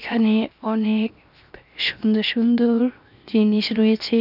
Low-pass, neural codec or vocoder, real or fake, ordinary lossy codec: 5.4 kHz; codec, 16 kHz, 0.7 kbps, FocalCodec; fake; none